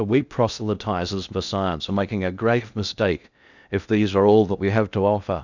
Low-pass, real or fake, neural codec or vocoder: 7.2 kHz; fake; codec, 16 kHz in and 24 kHz out, 0.6 kbps, FocalCodec, streaming, 2048 codes